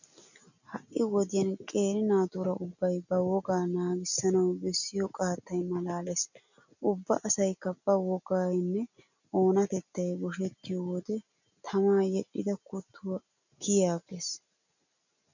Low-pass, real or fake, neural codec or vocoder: 7.2 kHz; real; none